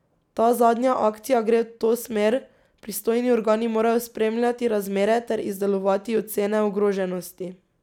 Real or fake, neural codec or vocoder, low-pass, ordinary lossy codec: real; none; 19.8 kHz; none